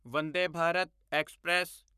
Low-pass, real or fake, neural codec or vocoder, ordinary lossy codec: 14.4 kHz; fake; codec, 44.1 kHz, 7.8 kbps, Pupu-Codec; none